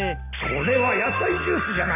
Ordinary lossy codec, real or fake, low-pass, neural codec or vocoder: AAC, 24 kbps; real; 3.6 kHz; none